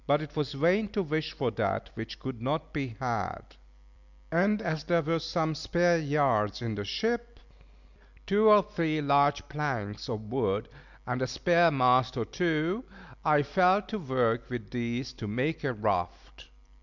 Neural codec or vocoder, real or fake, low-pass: none; real; 7.2 kHz